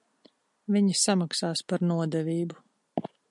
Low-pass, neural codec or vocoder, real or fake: 10.8 kHz; none; real